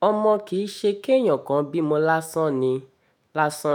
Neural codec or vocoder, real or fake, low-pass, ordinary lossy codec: autoencoder, 48 kHz, 128 numbers a frame, DAC-VAE, trained on Japanese speech; fake; none; none